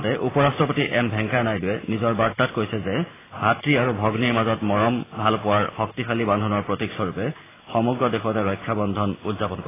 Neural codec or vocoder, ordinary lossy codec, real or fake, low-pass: none; AAC, 16 kbps; real; 3.6 kHz